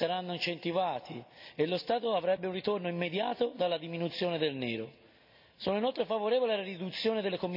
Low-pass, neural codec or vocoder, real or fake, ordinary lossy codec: 5.4 kHz; none; real; none